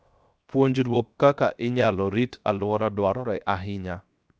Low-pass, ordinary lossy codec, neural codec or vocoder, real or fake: none; none; codec, 16 kHz, 0.7 kbps, FocalCodec; fake